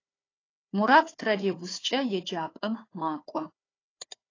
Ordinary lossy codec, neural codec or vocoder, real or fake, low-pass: AAC, 32 kbps; codec, 16 kHz, 4 kbps, FunCodec, trained on Chinese and English, 50 frames a second; fake; 7.2 kHz